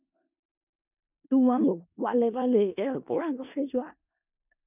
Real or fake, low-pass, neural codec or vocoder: fake; 3.6 kHz; codec, 16 kHz in and 24 kHz out, 0.4 kbps, LongCat-Audio-Codec, four codebook decoder